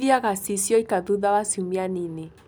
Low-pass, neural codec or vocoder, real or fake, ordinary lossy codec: none; none; real; none